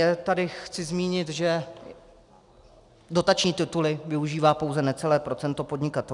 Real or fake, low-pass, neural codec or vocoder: real; 10.8 kHz; none